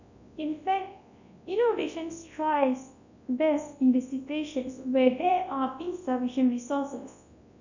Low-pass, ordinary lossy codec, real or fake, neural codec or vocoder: 7.2 kHz; none; fake; codec, 24 kHz, 0.9 kbps, WavTokenizer, large speech release